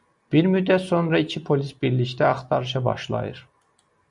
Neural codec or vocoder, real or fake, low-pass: none; real; 10.8 kHz